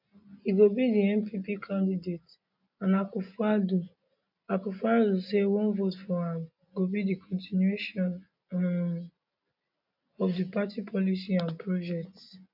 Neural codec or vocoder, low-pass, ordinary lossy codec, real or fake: none; 5.4 kHz; none; real